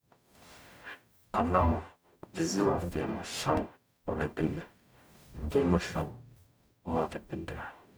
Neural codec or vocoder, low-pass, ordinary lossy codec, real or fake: codec, 44.1 kHz, 0.9 kbps, DAC; none; none; fake